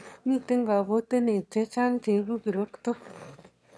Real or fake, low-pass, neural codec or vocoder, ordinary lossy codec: fake; none; autoencoder, 22.05 kHz, a latent of 192 numbers a frame, VITS, trained on one speaker; none